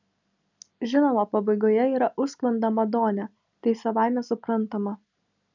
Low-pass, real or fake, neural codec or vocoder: 7.2 kHz; real; none